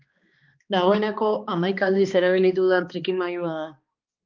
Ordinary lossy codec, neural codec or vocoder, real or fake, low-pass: Opus, 24 kbps; codec, 16 kHz, 2 kbps, X-Codec, HuBERT features, trained on balanced general audio; fake; 7.2 kHz